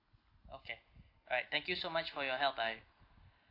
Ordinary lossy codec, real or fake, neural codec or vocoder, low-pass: AAC, 32 kbps; real; none; 5.4 kHz